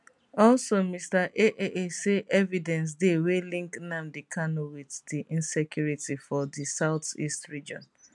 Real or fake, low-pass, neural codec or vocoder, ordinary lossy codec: real; 10.8 kHz; none; none